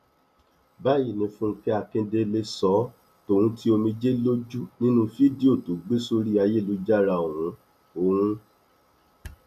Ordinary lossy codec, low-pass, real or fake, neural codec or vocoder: none; 14.4 kHz; real; none